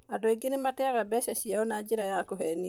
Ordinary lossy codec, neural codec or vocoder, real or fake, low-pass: none; vocoder, 44.1 kHz, 128 mel bands, Pupu-Vocoder; fake; none